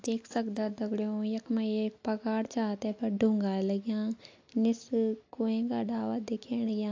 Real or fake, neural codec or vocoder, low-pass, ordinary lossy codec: real; none; 7.2 kHz; AAC, 48 kbps